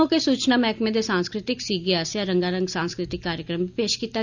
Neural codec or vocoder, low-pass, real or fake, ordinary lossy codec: none; 7.2 kHz; real; none